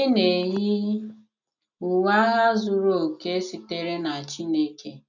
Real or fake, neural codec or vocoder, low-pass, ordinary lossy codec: real; none; 7.2 kHz; none